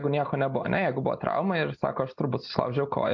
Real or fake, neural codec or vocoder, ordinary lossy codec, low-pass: real; none; MP3, 48 kbps; 7.2 kHz